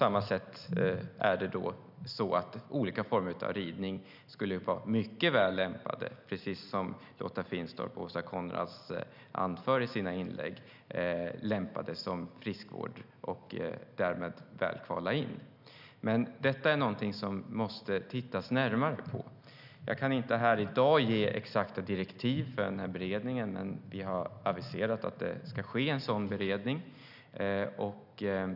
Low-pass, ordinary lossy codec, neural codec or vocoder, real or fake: 5.4 kHz; none; none; real